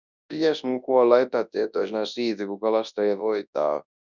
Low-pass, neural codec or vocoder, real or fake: 7.2 kHz; codec, 24 kHz, 0.9 kbps, WavTokenizer, large speech release; fake